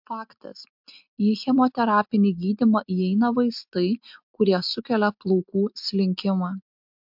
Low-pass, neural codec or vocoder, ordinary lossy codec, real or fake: 5.4 kHz; none; MP3, 48 kbps; real